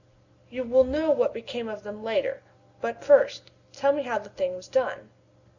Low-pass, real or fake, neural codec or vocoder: 7.2 kHz; real; none